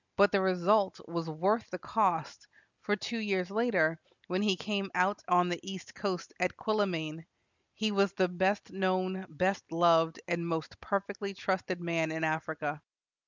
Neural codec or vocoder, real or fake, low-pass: none; real; 7.2 kHz